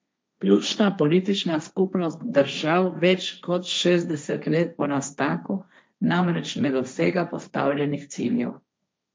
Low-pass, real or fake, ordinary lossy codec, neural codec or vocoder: none; fake; none; codec, 16 kHz, 1.1 kbps, Voila-Tokenizer